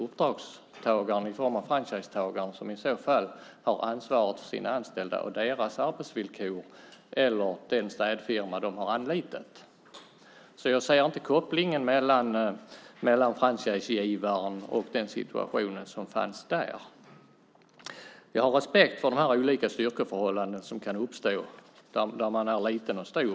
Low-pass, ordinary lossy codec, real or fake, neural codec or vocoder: none; none; real; none